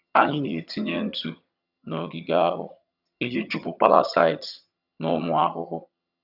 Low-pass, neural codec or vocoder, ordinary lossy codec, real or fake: 5.4 kHz; vocoder, 22.05 kHz, 80 mel bands, HiFi-GAN; none; fake